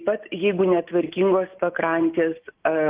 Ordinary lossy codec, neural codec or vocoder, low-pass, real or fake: Opus, 32 kbps; none; 3.6 kHz; real